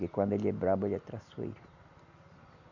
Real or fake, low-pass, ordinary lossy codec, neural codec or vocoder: real; 7.2 kHz; none; none